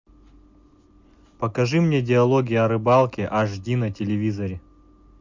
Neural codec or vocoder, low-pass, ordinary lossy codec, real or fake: none; 7.2 kHz; MP3, 64 kbps; real